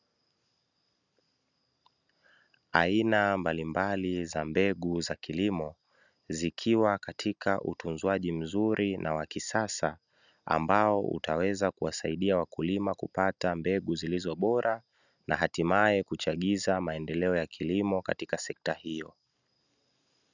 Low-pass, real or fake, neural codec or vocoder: 7.2 kHz; real; none